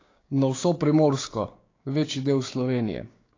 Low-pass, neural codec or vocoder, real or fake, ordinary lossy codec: 7.2 kHz; codec, 16 kHz, 6 kbps, DAC; fake; AAC, 32 kbps